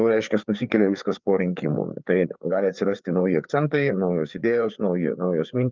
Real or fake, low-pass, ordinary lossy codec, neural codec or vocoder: fake; 7.2 kHz; Opus, 24 kbps; codec, 16 kHz, 4 kbps, FreqCodec, larger model